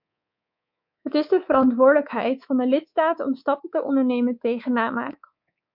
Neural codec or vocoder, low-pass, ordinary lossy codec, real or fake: codec, 24 kHz, 3.1 kbps, DualCodec; 5.4 kHz; MP3, 48 kbps; fake